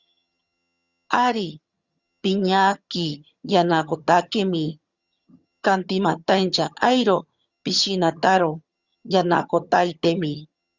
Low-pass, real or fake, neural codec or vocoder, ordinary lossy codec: 7.2 kHz; fake; vocoder, 22.05 kHz, 80 mel bands, HiFi-GAN; Opus, 64 kbps